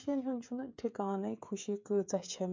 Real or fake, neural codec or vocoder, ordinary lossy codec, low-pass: fake; codec, 16 kHz, 16 kbps, FreqCodec, smaller model; MP3, 64 kbps; 7.2 kHz